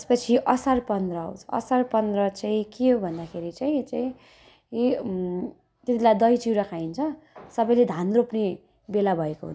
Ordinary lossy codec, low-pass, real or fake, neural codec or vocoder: none; none; real; none